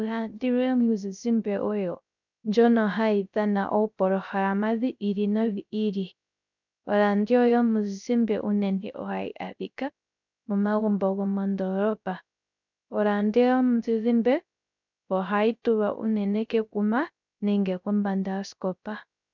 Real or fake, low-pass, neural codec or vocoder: fake; 7.2 kHz; codec, 16 kHz, 0.3 kbps, FocalCodec